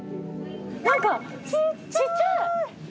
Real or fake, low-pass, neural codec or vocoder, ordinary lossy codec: real; none; none; none